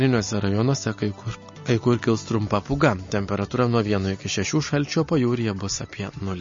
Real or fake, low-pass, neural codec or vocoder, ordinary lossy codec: real; 7.2 kHz; none; MP3, 32 kbps